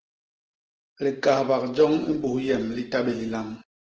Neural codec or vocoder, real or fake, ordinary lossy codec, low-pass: none; real; Opus, 16 kbps; 7.2 kHz